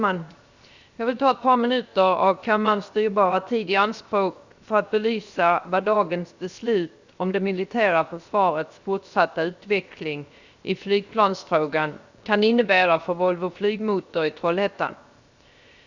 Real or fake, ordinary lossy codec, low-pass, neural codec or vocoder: fake; Opus, 64 kbps; 7.2 kHz; codec, 16 kHz, 0.7 kbps, FocalCodec